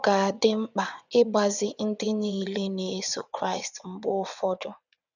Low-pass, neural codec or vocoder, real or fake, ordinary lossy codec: 7.2 kHz; vocoder, 22.05 kHz, 80 mel bands, WaveNeXt; fake; none